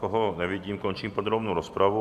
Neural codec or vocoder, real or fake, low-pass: none; real; 14.4 kHz